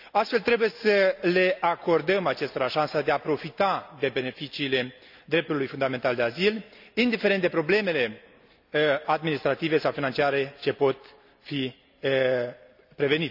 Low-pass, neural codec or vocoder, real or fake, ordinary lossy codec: 5.4 kHz; none; real; none